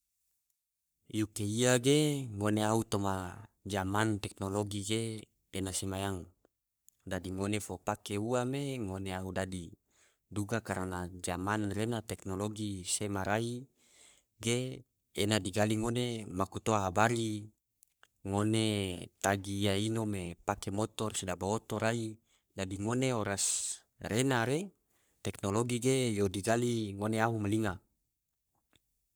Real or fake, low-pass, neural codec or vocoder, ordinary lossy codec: fake; none; codec, 44.1 kHz, 3.4 kbps, Pupu-Codec; none